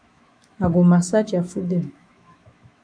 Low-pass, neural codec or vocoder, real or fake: 9.9 kHz; autoencoder, 48 kHz, 128 numbers a frame, DAC-VAE, trained on Japanese speech; fake